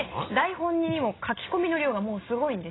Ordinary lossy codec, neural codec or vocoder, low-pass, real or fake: AAC, 16 kbps; none; 7.2 kHz; real